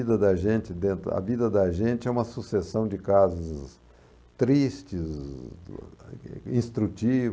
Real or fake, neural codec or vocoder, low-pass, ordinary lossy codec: real; none; none; none